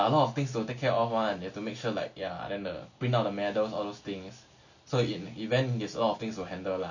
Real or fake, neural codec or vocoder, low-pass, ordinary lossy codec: real; none; 7.2 kHz; none